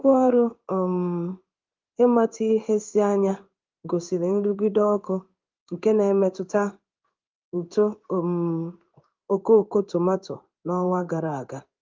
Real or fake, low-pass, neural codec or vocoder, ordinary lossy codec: fake; 7.2 kHz; codec, 16 kHz in and 24 kHz out, 1 kbps, XY-Tokenizer; Opus, 32 kbps